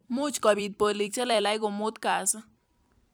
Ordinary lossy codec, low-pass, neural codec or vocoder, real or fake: none; none; vocoder, 44.1 kHz, 128 mel bands every 256 samples, BigVGAN v2; fake